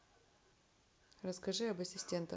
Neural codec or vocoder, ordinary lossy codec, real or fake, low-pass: none; none; real; none